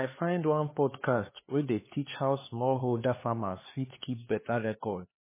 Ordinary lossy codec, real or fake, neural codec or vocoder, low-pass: MP3, 16 kbps; fake; codec, 16 kHz, 4 kbps, X-Codec, HuBERT features, trained on LibriSpeech; 3.6 kHz